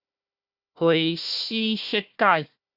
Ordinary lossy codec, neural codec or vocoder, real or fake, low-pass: Opus, 64 kbps; codec, 16 kHz, 1 kbps, FunCodec, trained on Chinese and English, 50 frames a second; fake; 5.4 kHz